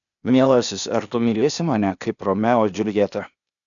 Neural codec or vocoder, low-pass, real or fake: codec, 16 kHz, 0.8 kbps, ZipCodec; 7.2 kHz; fake